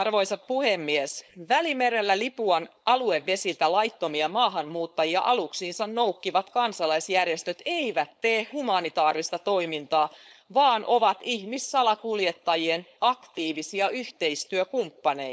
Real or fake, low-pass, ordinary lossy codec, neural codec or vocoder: fake; none; none; codec, 16 kHz, 4.8 kbps, FACodec